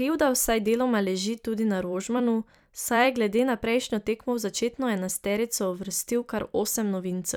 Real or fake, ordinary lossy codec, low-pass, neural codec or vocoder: fake; none; none; vocoder, 44.1 kHz, 128 mel bands every 256 samples, BigVGAN v2